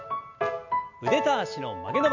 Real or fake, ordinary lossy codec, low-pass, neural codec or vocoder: real; none; 7.2 kHz; none